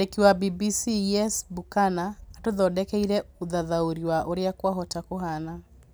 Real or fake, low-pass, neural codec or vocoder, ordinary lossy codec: real; none; none; none